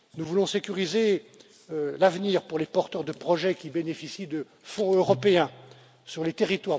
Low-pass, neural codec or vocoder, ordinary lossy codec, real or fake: none; none; none; real